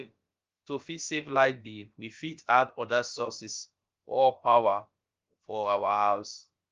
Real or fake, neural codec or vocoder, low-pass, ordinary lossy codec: fake; codec, 16 kHz, about 1 kbps, DyCAST, with the encoder's durations; 7.2 kHz; Opus, 32 kbps